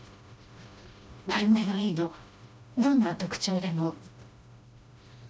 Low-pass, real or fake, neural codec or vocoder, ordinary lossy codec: none; fake; codec, 16 kHz, 1 kbps, FreqCodec, smaller model; none